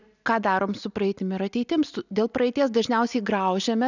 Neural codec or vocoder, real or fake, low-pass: none; real; 7.2 kHz